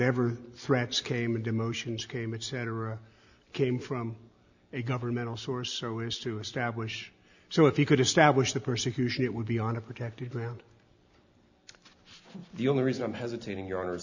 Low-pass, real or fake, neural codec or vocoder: 7.2 kHz; real; none